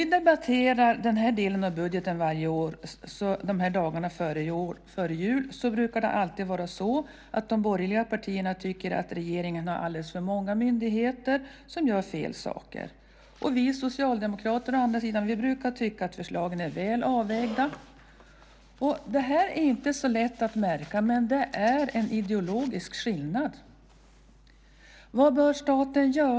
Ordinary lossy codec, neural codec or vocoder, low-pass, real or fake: none; none; none; real